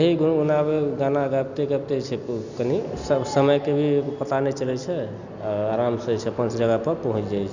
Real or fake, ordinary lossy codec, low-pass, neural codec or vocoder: real; none; 7.2 kHz; none